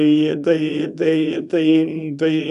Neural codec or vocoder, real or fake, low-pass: codec, 24 kHz, 0.9 kbps, WavTokenizer, small release; fake; 10.8 kHz